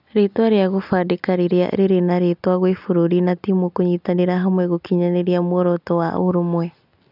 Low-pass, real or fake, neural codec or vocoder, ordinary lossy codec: 5.4 kHz; real; none; none